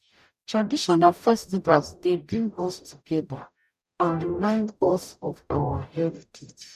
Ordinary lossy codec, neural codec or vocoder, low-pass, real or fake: none; codec, 44.1 kHz, 0.9 kbps, DAC; 14.4 kHz; fake